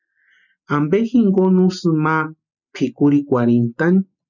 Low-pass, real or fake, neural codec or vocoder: 7.2 kHz; real; none